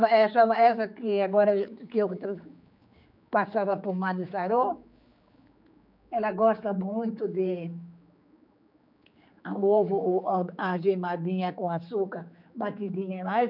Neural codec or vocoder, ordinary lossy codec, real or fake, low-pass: codec, 16 kHz, 4 kbps, X-Codec, HuBERT features, trained on general audio; none; fake; 5.4 kHz